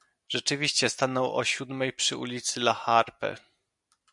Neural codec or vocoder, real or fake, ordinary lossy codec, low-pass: none; real; MP3, 96 kbps; 10.8 kHz